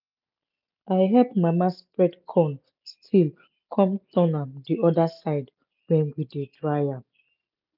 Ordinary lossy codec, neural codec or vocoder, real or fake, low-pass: none; none; real; 5.4 kHz